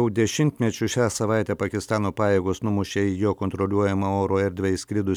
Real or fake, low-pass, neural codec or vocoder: real; 19.8 kHz; none